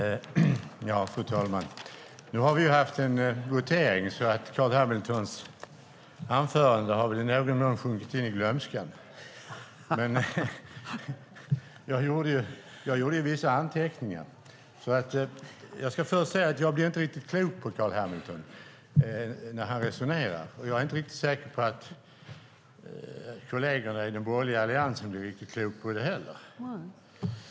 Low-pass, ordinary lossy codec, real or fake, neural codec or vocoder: none; none; real; none